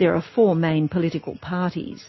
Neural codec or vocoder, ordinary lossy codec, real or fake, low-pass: none; MP3, 24 kbps; real; 7.2 kHz